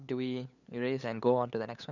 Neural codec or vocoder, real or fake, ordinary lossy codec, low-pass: codec, 16 kHz, 8 kbps, FunCodec, trained on LibriTTS, 25 frames a second; fake; none; 7.2 kHz